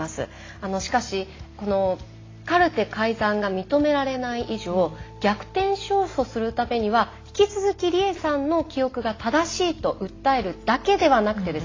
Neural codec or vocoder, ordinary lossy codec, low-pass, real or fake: none; AAC, 32 kbps; 7.2 kHz; real